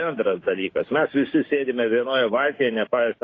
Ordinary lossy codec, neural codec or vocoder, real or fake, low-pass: AAC, 32 kbps; codec, 16 kHz, 6 kbps, DAC; fake; 7.2 kHz